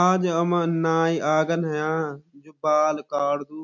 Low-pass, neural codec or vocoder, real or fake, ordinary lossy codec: 7.2 kHz; none; real; none